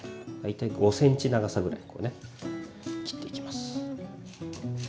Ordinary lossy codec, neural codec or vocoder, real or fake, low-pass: none; none; real; none